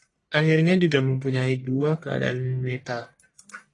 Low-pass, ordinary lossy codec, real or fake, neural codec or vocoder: 10.8 kHz; AAC, 32 kbps; fake; codec, 44.1 kHz, 1.7 kbps, Pupu-Codec